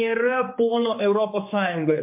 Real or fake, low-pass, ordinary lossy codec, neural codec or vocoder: fake; 3.6 kHz; MP3, 24 kbps; codec, 16 kHz, 4 kbps, X-Codec, HuBERT features, trained on general audio